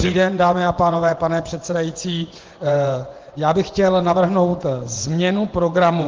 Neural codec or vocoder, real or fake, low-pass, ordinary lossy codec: vocoder, 24 kHz, 100 mel bands, Vocos; fake; 7.2 kHz; Opus, 16 kbps